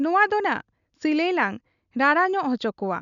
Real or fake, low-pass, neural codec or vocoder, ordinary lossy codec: real; 7.2 kHz; none; none